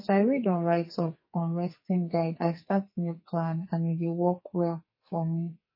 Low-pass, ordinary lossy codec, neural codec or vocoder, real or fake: 5.4 kHz; MP3, 24 kbps; codec, 44.1 kHz, 2.6 kbps, SNAC; fake